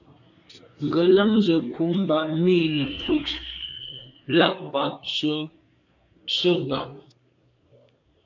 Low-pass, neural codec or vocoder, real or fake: 7.2 kHz; codec, 24 kHz, 1 kbps, SNAC; fake